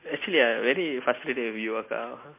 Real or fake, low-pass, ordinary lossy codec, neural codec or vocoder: real; 3.6 kHz; MP3, 24 kbps; none